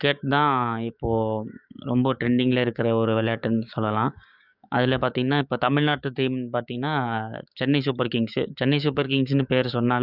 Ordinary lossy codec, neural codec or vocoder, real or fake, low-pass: none; codec, 16 kHz, 6 kbps, DAC; fake; 5.4 kHz